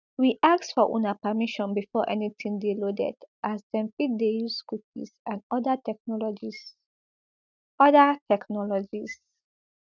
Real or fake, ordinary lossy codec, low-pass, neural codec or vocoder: real; none; 7.2 kHz; none